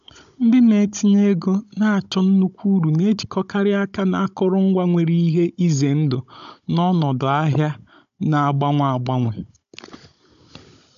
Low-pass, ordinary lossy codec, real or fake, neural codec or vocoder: 7.2 kHz; AAC, 96 kbps; fake; codec, 16 kHz, 16 kbps, FunCodec, trained on Chinese and English, 50 frames a second